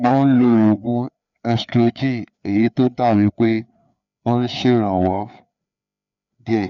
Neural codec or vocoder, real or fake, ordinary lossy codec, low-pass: codec, 16 kHz, 4 kbps, FreqCodec, larger model; fake; none; 7.2 kHz